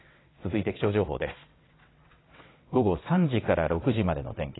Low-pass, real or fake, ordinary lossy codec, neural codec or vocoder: 7.2 kHz; fake; AAC, 16 kbps; vocoder, 22.05 kHz, 80 mel bands, WaveNeXt